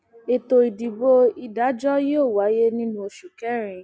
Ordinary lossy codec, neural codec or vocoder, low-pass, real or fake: none; none; none; real